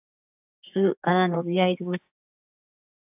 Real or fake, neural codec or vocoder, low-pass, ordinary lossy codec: fake; codec, 32 kHz, 1.9 kbps, SNAC; 3.6 kHz; AAC, 32 kbps